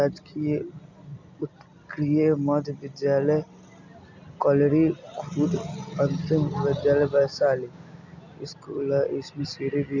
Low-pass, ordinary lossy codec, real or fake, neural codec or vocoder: 7.2 kHz; none; real; none